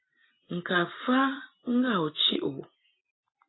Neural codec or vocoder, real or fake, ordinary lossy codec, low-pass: none; real; AAC, 16 kbps; 7.2 kHz